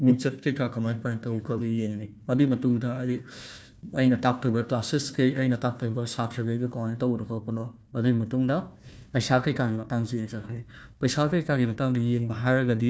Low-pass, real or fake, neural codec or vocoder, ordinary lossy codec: none; fake; codec, 16 kHz, 1 kbps, FunCodec, trained on Chinese and English, 50 frames a second; none